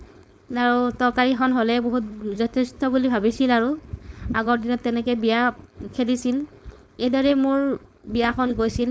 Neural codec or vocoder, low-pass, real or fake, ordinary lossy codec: codec, 16 kHz, 4.8 kbps, FACodec; none; fake; none